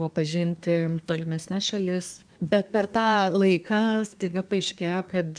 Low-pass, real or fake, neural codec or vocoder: 9.9 kHz; fake; codec, 24 kHz, 1 kbps, SNAC